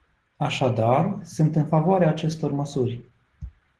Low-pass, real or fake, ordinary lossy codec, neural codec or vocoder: 10.8 kHz; real; Opus, 16 kbps; none